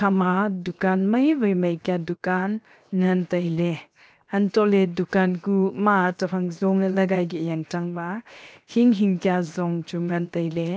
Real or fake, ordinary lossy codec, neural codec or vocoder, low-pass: fake; none; codec, 16 kHz, 0.7 kbps, FocalCodec; none